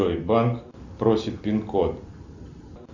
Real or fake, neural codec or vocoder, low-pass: real; none; 7.2 kHz